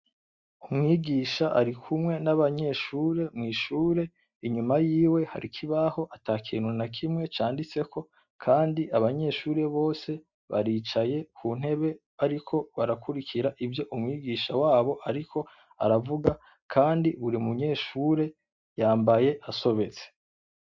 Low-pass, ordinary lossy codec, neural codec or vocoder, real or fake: 7.2 kHz; Opus, 64 kbps; none; real